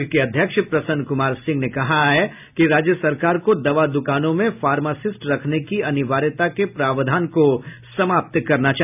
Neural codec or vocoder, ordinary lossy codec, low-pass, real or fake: none; none; 3.6 kHz; real